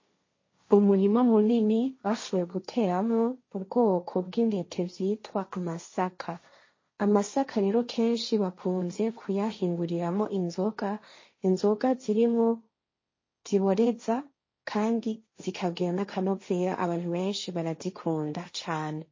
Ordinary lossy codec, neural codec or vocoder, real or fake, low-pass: MP3, 32 kbps; codec, 16 kHz, 1.1 kbps, Voila-Tokenizer; fake; 7.2 kHz